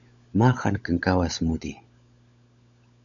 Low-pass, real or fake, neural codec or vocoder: 7.2 kHz; fake; codec, 16 kHz, 16 kbps, FunCodec, trained on LibriTTS, 50 frames a second